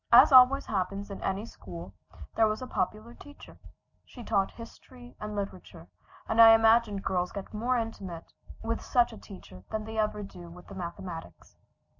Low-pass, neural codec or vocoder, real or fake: 7.2 kHz; none; real